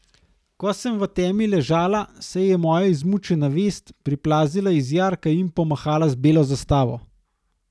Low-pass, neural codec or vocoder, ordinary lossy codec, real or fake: none; none; none; real